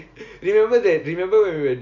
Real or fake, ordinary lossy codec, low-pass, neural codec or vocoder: real; none; 7.2 kHz; none